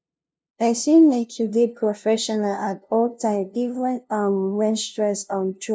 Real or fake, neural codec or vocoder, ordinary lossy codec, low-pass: fake; codec, 16 kHz, 0.5 kbps, FunCodec, trained on LibriTTS, 25 frames a second; none; none